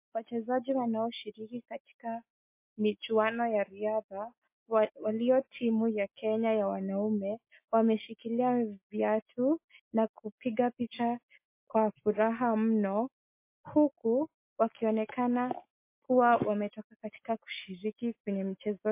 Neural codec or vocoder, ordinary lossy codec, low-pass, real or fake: none; MP3, 24 kbps; 3.6 kHz; real